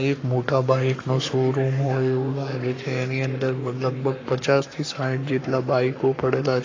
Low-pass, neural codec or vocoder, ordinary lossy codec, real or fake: 7.2 kHz; codec, 44.1 kHz, 7.8 kbps, Pupu-Codec; AAC, 32 kbps; fake